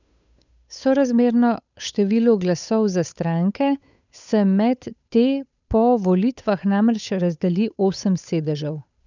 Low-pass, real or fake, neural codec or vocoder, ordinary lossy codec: 7.2 kHz; fake; codec, 16 kHz, 8 kbps, FunCodec, trained on Chinese and English, 25 frames a second; none